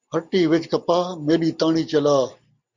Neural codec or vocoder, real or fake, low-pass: none; real; 7.2 kHz